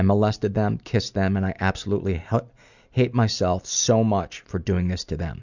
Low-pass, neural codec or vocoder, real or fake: 7.2 kHz; none; real